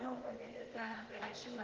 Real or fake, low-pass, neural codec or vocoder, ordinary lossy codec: fake; 7.2 kHz; codec, 16 kHz, 0.8 kbps, ZipCodec; Opus, 16 kbps